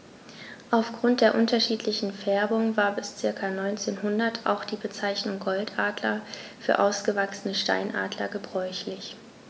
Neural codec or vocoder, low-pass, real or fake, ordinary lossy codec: none; none; real; none